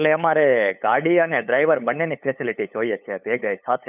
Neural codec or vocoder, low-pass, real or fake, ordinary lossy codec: codec, 16 kHz, 8 kbps, FunCodec, trained on LibriTTS, 25 frames a second; 3.6 kHz; fake; none